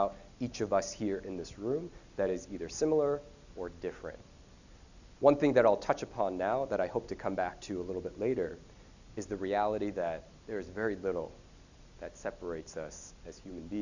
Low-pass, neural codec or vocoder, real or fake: 7.2 kHz; none; real